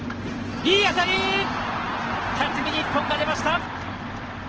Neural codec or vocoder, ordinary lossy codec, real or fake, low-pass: none; Opus, 16 kbps; real; 7.2 kHz